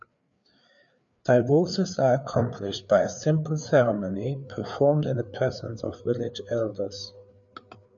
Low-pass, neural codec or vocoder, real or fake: 7.2 kHz; codec, 16 kHz, 4 kbps, FreqCodec, larger model; fake